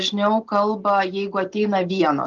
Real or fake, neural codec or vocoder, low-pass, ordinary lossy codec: real; none; 7.2 kHz; Opus, 32 kbps